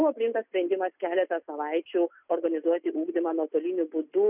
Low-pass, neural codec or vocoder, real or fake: 3.6 kHz; none; real